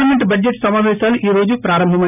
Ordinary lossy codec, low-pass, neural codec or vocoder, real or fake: none; 3.6 kHz; none; real